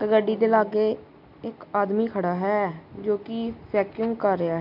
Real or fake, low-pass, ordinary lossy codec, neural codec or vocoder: real; 5.4 kHz; MP3, 48 kbps; none